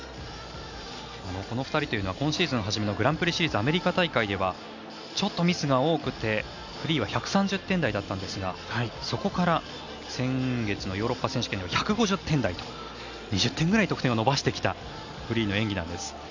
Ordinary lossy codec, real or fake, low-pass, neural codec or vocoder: none; real; 7.2 kHz; none